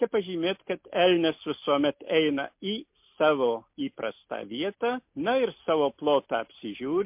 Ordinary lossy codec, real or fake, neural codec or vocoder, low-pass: MP3, 32 kbps; real; none; 3.6 kHz